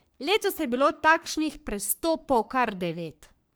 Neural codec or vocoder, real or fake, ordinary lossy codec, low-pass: codec, 44.1 kHz, 3.4 kbps, Pupu-Codec; fake; none; none